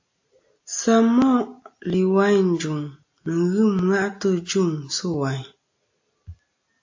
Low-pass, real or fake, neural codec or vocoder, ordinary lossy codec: 7.2 kHz; real; none; AAC, 48 kbps